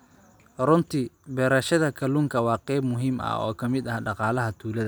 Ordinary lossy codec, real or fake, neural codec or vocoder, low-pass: none; real; none; none